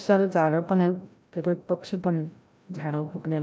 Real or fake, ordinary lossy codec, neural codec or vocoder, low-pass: fake; none; codec, 16 kHz, 0.5 kbps, FreqCodec, larger model; none